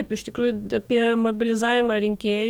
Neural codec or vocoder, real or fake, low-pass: codec, 44.1 kHz, 2.6 kbps, DAC; fake; 19.8 kHz